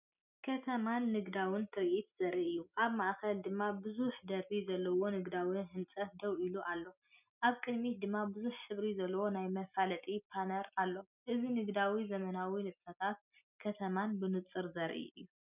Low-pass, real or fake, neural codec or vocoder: 3.6 kHz; real; none